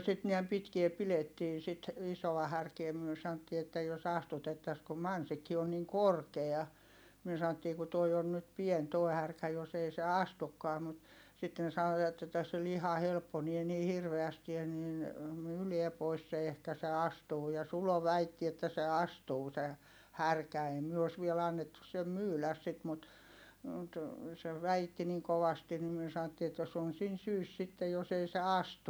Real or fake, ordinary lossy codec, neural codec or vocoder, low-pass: real; none; none; none